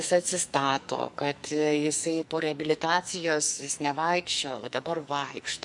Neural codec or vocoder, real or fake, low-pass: codec, 44.1 kHz, 2.6 kbps, SNAC; fake; 10.8 kHz